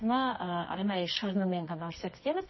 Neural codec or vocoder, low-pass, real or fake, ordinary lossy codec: codec, 24 kHz, 0.9 kbps, WavTokenizer, medium music audio release; 7.2 kHz; fake; MP3, 24 kbps